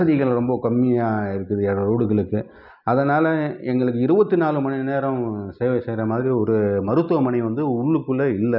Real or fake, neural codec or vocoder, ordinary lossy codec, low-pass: real; none; none; 5.4 kHz